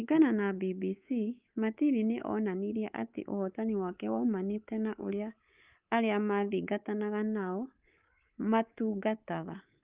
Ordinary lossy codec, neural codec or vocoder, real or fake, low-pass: Opus, 24 kbps; codec, 24 kHz, 3.1 kbps, DualCodec; fake; 3.6 kHz